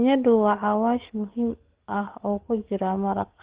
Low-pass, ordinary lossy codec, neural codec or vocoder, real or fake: 3.6 kHz; Opus, 16 kbps; codec, 44.1 kHz, 7.8 kbps, DAC; fake